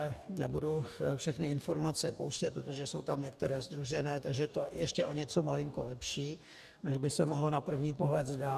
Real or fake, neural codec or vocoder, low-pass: fake; codec, 44.1 kHz, 2.6 kbps, DAC; 14.4 kHz